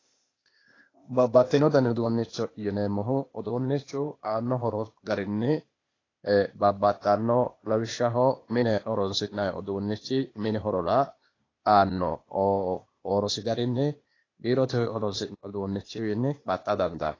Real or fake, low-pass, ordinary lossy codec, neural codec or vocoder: fake; 7.2 kHz; AAC, 32 kbps; codec, 16 kHz, 0.8 kbps, ZipCodec